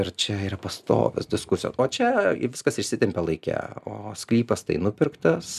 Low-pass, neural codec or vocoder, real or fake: 14.4 kHz; none; real